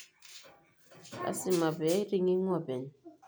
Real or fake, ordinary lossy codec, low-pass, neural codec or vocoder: real; none; none; none